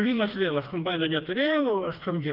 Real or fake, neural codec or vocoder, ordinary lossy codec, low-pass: fake; codec, 16 kHz, 2 kbps, FreqCodec, smaller model; Opus, 32 kbps; 5.4 kHz